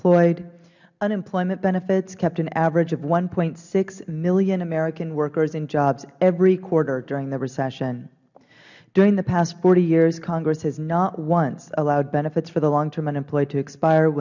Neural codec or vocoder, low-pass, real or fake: none; 7.2 kHz; real